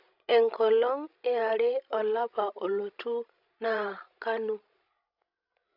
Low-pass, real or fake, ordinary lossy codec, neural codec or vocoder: 5.4 kHz; fake; none; codec, 16 kHz, 16 kbps, FreqCodec, larger model